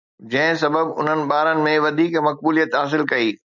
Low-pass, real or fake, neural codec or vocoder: 7.2 kHz; real; none